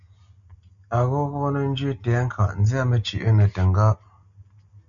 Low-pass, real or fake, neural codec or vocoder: 7.2 kHz; real; none